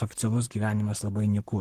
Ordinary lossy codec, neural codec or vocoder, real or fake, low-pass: Opus, 16 kbps; codec, 44.1 kHz, 7.8 kbps, Pupu-Codec; fake; 14.4 kHz